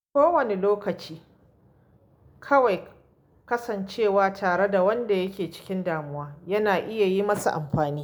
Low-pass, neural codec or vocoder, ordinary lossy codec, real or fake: none; none; none; real